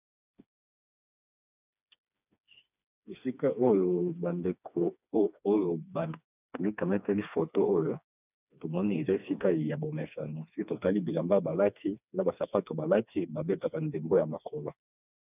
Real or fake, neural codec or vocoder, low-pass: fake; codec, 16 kHz, 2 kbps, FreqCodec, smaller model; 3.6 kHz